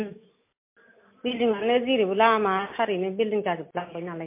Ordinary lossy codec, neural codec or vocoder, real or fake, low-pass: MP3, 24 kbps; none; real; 3.6 kHz